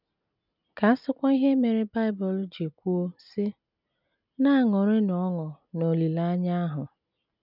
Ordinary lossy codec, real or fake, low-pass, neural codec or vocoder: none; real; 5.4 kHz; none